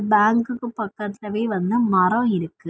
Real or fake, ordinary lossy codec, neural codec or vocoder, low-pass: real; none; none; none